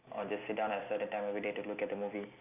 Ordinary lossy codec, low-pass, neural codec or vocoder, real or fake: none; 3.6 kHz; none; real